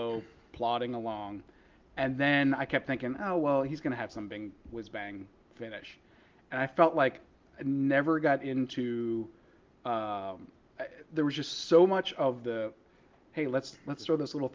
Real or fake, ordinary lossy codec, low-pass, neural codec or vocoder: real; Opus, 32 kbps; 7.2 kHz; none